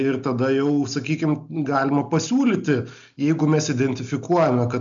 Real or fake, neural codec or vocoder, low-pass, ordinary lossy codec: real; none; 7.2 kHz; MP3, 64 kbps